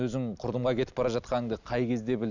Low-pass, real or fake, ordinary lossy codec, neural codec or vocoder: 7.2 kHz; real; none; none